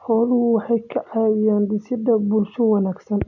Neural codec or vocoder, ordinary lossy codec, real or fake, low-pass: none; none; real; 7.2 kHz